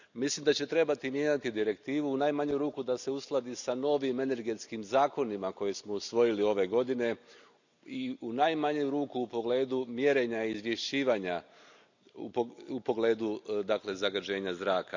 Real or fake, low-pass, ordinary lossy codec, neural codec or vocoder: real; 7.2 kHz; none; none